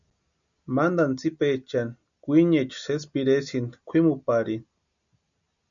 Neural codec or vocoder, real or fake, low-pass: none; real; 7.2 kHz